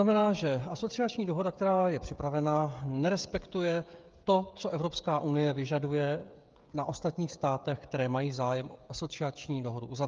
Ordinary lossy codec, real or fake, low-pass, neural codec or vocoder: Opus, 32 kbps; fake; 7.2 kHz; codec, 16 kHz, 16 kbps, FreqCodec, smaller model